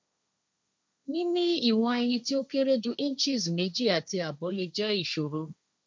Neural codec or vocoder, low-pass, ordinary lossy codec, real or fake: codec, 16 kHz, 1.1 kbps, Voila-Tokenizer; none; none; fake